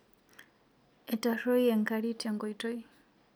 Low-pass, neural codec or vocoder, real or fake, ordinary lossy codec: none; none; real; none